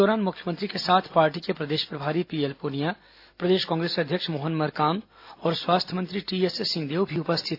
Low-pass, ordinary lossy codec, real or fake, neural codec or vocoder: 5.4 kHz; AAC, 32 kbps; real; none